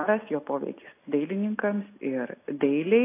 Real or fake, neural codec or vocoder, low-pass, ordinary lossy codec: real; none; 3.6 kHz; AAC, 24 kbps